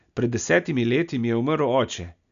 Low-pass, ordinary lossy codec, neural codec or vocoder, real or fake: 7.2 kHz; none; none; real